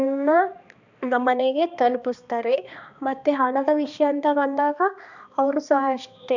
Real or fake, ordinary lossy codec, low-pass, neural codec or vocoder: fake; none; 7.2 kHz; codec, 16 kHz, 2 kbps, X-Codec, HuBERT features, trained on balanced general audio